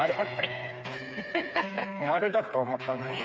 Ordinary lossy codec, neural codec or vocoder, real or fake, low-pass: none; codec, 16 kHz, 4 kbps, FreqCodec, smaller model; fake; none